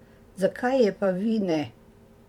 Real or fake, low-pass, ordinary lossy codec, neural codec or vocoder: fake; 19.8 kHz; MP3, 96 kbps; vocoder, 44.1 kHz, 128 mel bands every 512 samples, BigVGAN v2